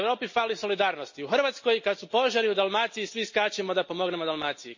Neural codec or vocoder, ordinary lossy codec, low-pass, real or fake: none; MP3, 32 kbps; 7.2 kHz; real